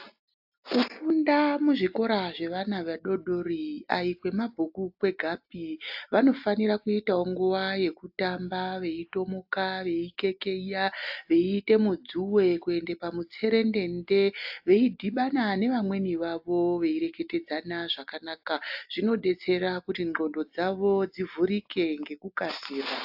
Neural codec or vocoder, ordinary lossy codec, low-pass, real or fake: none; AAC, 48 kbps; 5.4 kHz; real